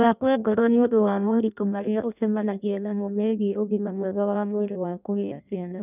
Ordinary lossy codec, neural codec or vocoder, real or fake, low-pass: none; codec, 16 kHz in and 24 kHz out, 0.6 kbps, FireRedTTS-2 codec; fake; 3.6 kHz